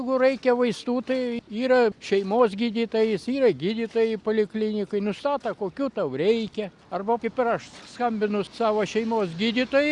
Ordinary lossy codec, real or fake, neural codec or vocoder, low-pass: Opus, 64 kbps; real; none; 10.8 kHz